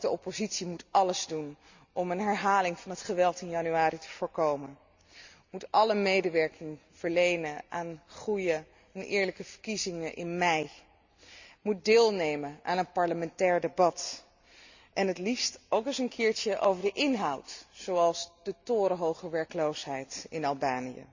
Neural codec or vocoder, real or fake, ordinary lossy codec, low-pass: none; real; Opus, 64 kbps; 7.2 kHz